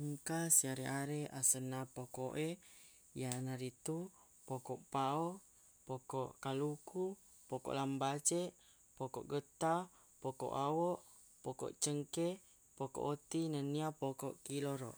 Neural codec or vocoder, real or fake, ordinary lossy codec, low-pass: none; real; none; none